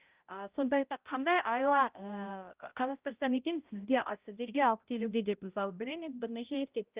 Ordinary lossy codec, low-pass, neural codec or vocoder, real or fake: Opus, 32 kbps; 3.6 kHz; codec, 16 kHz, 0.5 kbps, X-Codec, HuBERT features, trained on balanced general audio; fake